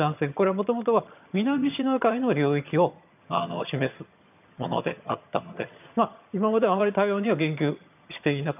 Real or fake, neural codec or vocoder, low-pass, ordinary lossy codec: fake; vocoder, 22.05 kHz, 80 mel bands, HiFi-GAN; 3.6 kHz; none